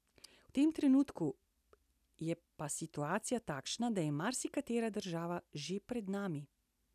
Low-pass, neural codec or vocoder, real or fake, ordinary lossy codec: 14.4 kHz; none; real; none